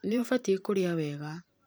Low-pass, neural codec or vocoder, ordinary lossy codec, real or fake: none; vocoder, 44.1 kHz, 128 mel bands every 512 samples, BigVGAN v2; none; fake